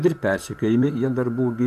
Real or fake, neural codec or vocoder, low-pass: fake; vocoder, 44.1 kHz, 128 mel bands, Pupu-Vocoder; 14.4 kHz